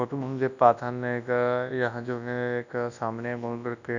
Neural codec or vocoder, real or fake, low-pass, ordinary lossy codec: codec, 24 kHz, 0.9 kbps, WavTokenizer, large speech release; fake; 7.2 kHz; none